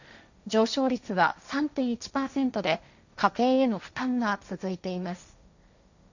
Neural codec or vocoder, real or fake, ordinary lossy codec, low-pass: codec, 16 kHz, 1.1 kbps, Voila-Tokenizer; fake; none; 7.2 kHz